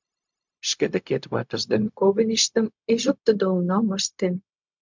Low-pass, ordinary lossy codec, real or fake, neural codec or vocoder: 7.2 kHz; MP3, 64 kbps; fake; codec, 16 kHz, 0.4 kbps, LongCat-Audio-Codec